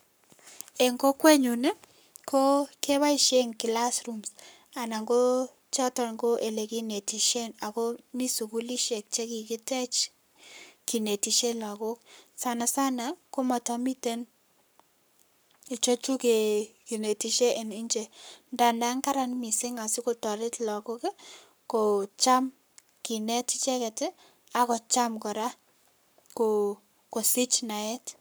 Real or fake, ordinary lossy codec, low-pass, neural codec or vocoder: fake; none; none; codec, 44.1 kHz, 7.8 kbps, Pupu-Codec